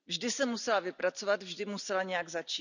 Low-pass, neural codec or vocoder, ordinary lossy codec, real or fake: 7.2 kHz; none; none; real